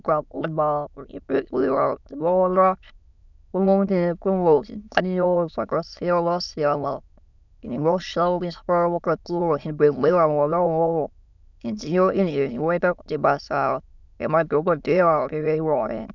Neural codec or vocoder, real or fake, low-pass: autoencoder, 22.05 kHz, a latent of 192 numbers a frame, VITS, trained on many speakers; fake; 7.2 kHz